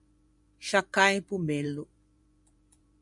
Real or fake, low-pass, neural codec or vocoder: fake; 10.8 kHz; vocoder, 24 kHz, 100 mel bands, Vocos